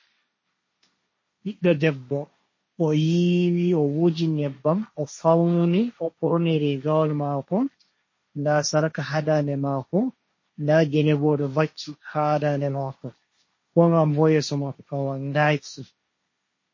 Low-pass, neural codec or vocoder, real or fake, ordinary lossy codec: 7.2 kHz; codec, 16 kHz, 1.1 kbps, Voila-Tokenizer; fake; MP3, 32 kbps